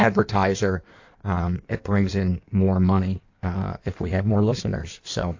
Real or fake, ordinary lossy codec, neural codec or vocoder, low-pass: fake; AAC, 48 kbps; codec, 16 kHz in and 24 kHz out, 1.1 kbps, FireRedTTS-2 codec; 7.2 kHz